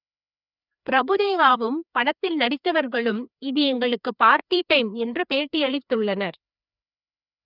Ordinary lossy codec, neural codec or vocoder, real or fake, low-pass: none; codec, 16 kHz, 2 kbps, FreqCodec, larger model; fake; 5.4 kHz